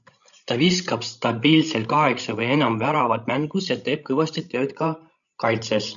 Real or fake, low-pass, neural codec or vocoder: fake; 7.2 kHz; codec, 16 kHz, 16 kbps, FreqCodec, larger model